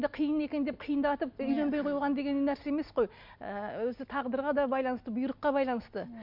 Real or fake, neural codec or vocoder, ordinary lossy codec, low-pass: real; none; MP3, 48 kbps; 5.4 kHz